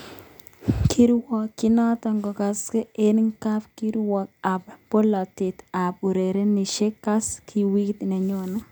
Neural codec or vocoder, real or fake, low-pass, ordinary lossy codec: none; real; none; none